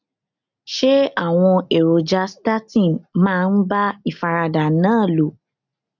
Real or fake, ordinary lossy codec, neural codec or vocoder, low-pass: real; none; none; 7.2 kHz